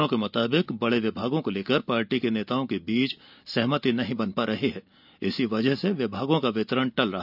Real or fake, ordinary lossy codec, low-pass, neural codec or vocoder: real; none; 5.4 kHz; none